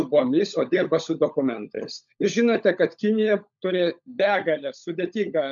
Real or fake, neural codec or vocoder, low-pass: fake; codec, 16 kHz, 16 kbps, FunCodec, trained on LibriTTS, 50 frames a second; 7.2 kHz